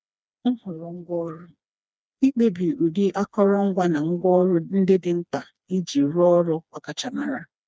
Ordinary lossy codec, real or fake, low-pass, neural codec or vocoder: none; fake; none; codec, 16 kHz, 2 kbps, FreqCodec, smaller model